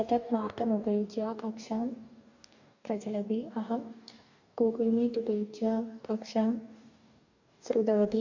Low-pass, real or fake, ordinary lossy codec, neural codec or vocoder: 7.2 kHz; fake; none; codec, 44.1 kHz, 2.6 kbps, DAC